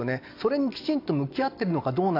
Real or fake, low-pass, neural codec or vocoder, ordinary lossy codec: real; 5.4 kHz; none; AAC, 32 kbps